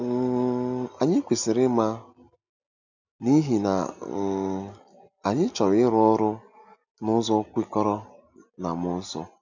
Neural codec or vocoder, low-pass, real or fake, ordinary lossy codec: none; 7.2 kHz; real; none